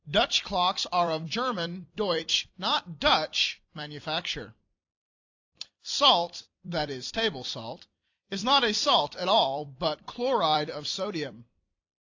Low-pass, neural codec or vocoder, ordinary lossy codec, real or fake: 7.2 kHz; vocoder, 44.1 kHz, 128 mel bands every 256 samples, BigVGAN v2; AAC, 48 kbps; fake